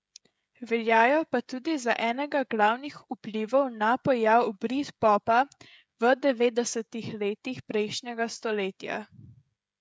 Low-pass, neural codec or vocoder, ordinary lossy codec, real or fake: none; codec, 16 kHz, 16 kbps, FreqCodec, smaller model; none; fake